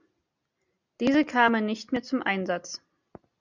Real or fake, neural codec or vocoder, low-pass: real; none; 7.2 kHz